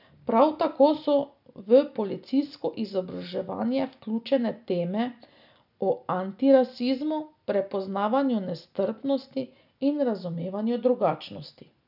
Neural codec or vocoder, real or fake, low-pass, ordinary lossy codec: none; real; 5.4 kHz; none